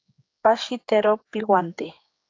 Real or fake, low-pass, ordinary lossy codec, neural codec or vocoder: fake; 7.2 kHz; AAC, 32 kbps; codec, 16 kHz, 4 kbps, X-Codec, HuBERT features, trained on general audio